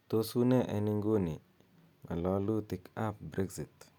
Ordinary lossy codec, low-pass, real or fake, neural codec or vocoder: none; 19.8 kHz; real; none